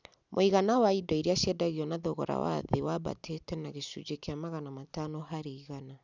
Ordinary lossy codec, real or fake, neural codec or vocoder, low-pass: none; real; none; 7.2 kHz